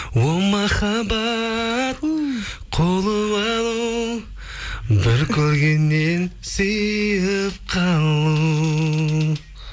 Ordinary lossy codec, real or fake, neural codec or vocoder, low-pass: none; real; none; none